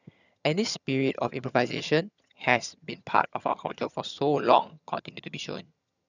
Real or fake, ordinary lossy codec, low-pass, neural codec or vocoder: fake; none; 7.2 kHz; vocoder, 22.05 kHz, 80 mel bands, HiFi-GAN